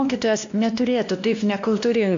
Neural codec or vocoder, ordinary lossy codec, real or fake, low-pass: codec, 16 kHz, 1 kbps, X-Codec, WavLM features, trained on Multilingual LibriSpeech; MP3, 64 kbps; fake; 7.2 kHz